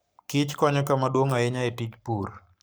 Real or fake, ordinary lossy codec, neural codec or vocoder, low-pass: fake; none; codec, 44.1 kHz, 7.8 kbps, Pupu-Codec; none